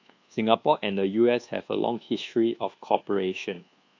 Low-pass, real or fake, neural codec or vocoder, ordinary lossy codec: 7.2 kHz; fake; codec, 24 kHz, 1.2 kbps, DualCodec; AAC, 48 kbps